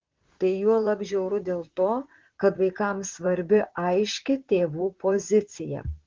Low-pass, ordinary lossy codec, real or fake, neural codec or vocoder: 7.2 kHz; Opus, 16 kbps; fake; vocoder, 44.1 kHz, 128 mel bands, Pupu-Vocoder